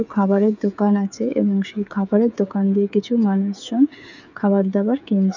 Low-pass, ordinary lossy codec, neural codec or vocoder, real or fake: 7.2 kHz; none; codec, 16 kHz, 8 kbps, FreqCodec, smaller model; fake